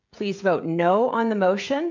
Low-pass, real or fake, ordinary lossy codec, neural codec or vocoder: 7.2 kHz; fake; MP3, 64 kbps; codec, 16 kHz, 16 kbps, FreqCodec, smaller model